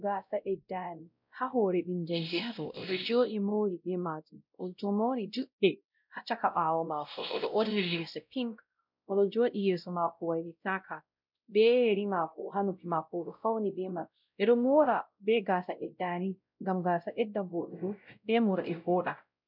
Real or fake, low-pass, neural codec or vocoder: fake; 5.4 kHz; codec, 16 kHz, 0.5 kbps, X-Codec, WavLM features, trained on Multilingual LibriSpeech